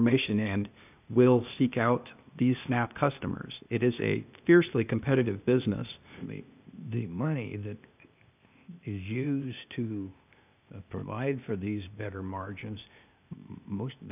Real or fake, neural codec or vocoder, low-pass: fake; codec, 16 kHz, 0.8 kbps, ZipCodec; 3.6 kHz